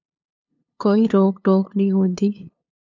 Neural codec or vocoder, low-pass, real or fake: codec, 16 kHz, 2 kbps, FunCodec, trained on LibriTTS, 25 frames a second; 7.2 kHz; fake